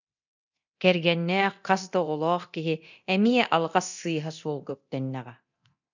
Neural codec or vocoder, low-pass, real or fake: codec, 24 kHz, 0.9 kbps, DualCodec; 7.2 kHz; fake